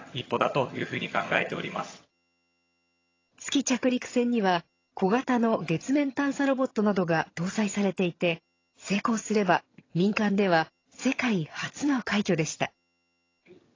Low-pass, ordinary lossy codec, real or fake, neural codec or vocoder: 7.2 kHz; AAC, 32 kbps; fake; vocoder, 22.05 kHz, 80 mel bands, HiFi-GAN